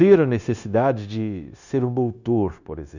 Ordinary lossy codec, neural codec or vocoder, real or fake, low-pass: none; codec, 16 kHz, 0.9 kbps, LongCat-Audio-Codec; fake; 7.2 kHz